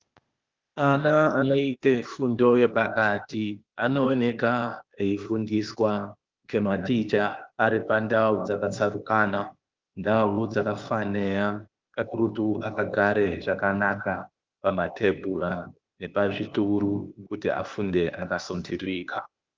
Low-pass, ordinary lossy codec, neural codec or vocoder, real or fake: 7.2 kHz; Opus, 32 kbps; codec, 16 kHz, 0.8 kbps, ZipCodec; fake